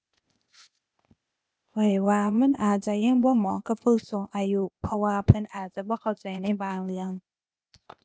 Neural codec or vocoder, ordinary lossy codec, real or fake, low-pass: codec, 16 kHz, 0.8 kbps, ZipCodec; none; fake; none